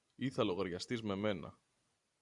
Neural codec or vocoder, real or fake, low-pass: none; real; 10.8 kHz